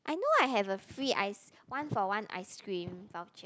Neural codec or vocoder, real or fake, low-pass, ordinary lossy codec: none; real; none; none